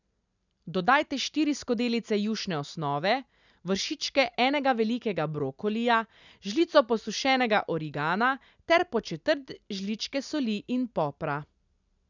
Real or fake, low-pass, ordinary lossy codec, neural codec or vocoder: real; 7.2 kHz; none; none